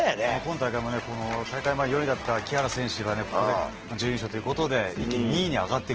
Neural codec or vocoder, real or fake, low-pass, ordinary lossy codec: none; real; 7.2 kHz; Opus, 16 kbps